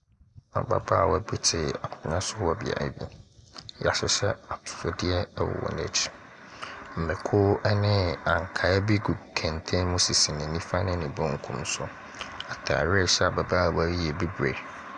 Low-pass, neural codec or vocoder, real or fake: 10.8 kHz; none; real